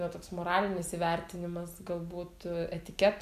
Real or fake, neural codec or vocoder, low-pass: real; none; 14.4 kHz